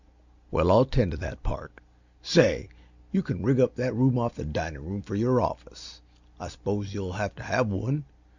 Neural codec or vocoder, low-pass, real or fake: none; 7.2 kHz; real